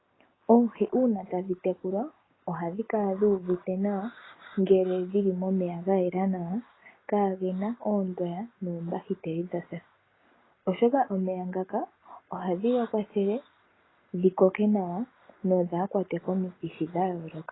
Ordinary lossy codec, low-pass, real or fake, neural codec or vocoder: AAC, 16 kbps; 7.2 kHz; real; none